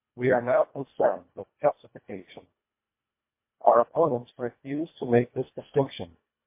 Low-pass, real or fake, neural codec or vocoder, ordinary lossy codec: 3.6 kHz; fake; codec, 24 kHz, 1.5 kbps, HILCodec; MP3, 24 kbps